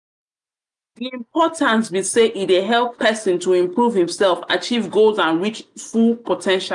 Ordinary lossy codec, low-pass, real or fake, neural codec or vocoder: none; 10.8 kHz; real; none